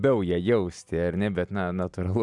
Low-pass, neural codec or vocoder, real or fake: 10.8 kHz; none; real